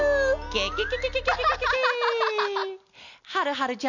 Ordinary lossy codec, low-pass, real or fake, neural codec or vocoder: none; 7.2 kHz; real; none